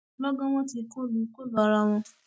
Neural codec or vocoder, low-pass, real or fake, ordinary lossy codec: none; none; real; none